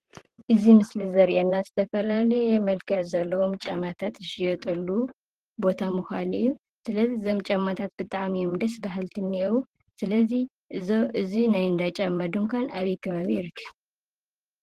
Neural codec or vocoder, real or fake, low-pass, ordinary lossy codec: vocoder, 44.1 kHz, 128 mel bands, Pupu-Vocoder; fake; 14.4 kHz; Opus, 16 kbps